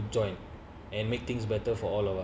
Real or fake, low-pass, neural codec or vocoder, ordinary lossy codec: real; none; none; none